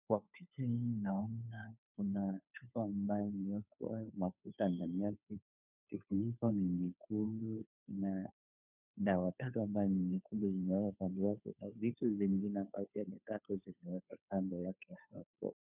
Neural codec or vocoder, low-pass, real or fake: codec, 16 kHz, 2 kbps, FunCodec, trained on Chinese and English, 25 frames a second; 3.6 kHz; fake